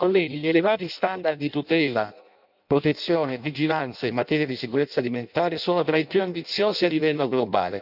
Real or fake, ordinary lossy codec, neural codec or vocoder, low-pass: fake; none; codec, 16 kHz in and 24 kHz out, 0.6 kbps, FireRedTTS-2 codec; 5.4 kHz